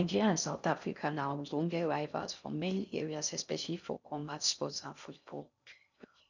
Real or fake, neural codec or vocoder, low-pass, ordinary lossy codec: fake; codec, 16 kHz in and 24 kHz out, 0.6 kbps, FocalCodec, streaming, 4096 codes; 7.2 kHz; none